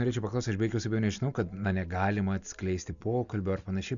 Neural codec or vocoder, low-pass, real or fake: none; 7.2 kHz; real